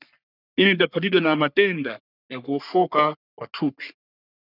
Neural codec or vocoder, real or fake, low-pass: codec, 44.1 kHz, 3.4 kbps, Pupu-Codec; fake; 5.4 kHz